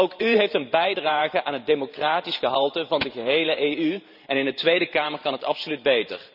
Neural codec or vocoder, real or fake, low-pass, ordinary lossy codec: vocoder, 44.1 kHz, 128 mel bands every 512 samples, BigVGAN v2; fake; 5.4 kHz; none